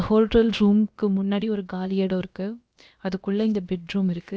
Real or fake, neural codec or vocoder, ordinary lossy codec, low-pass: fake; codec, 16 kHz, about 1 kbps, DyCAST, with the encoder's durations; none; none